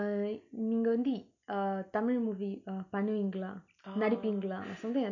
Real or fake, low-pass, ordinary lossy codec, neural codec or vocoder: real; 7.2 kHz; none; none